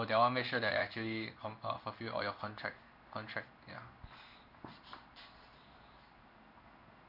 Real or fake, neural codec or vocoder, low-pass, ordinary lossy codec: fake; codec, 16 kHz in and 24 kHz out, 1 kbps, XY-Tokenizer; 5.4 kHz; none